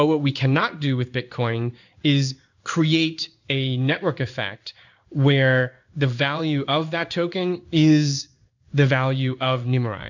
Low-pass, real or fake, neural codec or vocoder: 7.2 kHz; fake; codec, 16 kHz in and 24 kHz out, 1 kbps, XY-Tokenizer